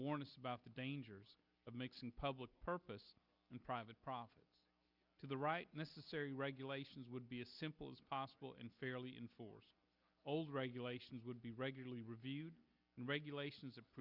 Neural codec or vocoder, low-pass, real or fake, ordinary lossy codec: none; 5.4 kHz; real; Opus, 64 kbps